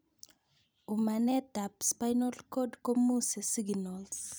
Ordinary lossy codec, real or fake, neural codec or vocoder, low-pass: none; fake; vocoder, 44.1 kHz, 128 mel bands every 256 samples, BigVGAN v2; none